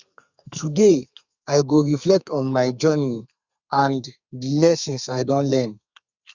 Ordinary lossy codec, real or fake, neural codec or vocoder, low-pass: Opus, 64 kbps; fake; codec, 32 kHz, 1.9 kbps, SNAC; 7.2 kHz